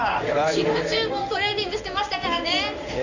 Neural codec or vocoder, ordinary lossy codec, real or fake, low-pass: codec, 16 kHz in and 24 kHz out, 1 kbps, XY-Tokenizer; none; fake; 7.2 kHz